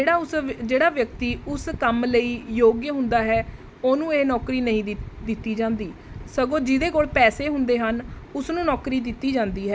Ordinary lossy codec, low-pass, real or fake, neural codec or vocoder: none; none; real; none